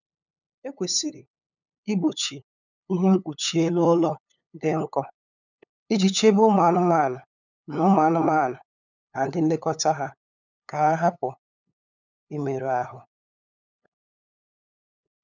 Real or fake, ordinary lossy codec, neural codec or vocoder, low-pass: fake; none; codec, 16 kHz, 8 kbps, FunCodec, trained on LibriTTS, 25 frames a second; 7.2 kHz